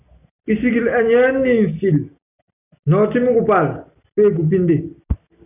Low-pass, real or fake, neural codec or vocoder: 3.6 kHz; real; none